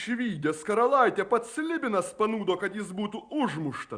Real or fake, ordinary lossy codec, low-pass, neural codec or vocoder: real; Opus, 64 kbps; 9.9 kHz; none